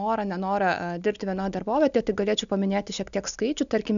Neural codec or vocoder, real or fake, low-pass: none; real; 7.2 kHz